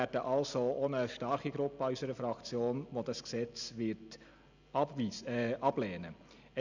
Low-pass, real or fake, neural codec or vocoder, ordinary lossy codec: 7.2 kHz; real; none; none